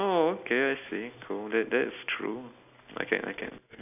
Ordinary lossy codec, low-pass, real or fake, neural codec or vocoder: none; 3.6 kHz; real; none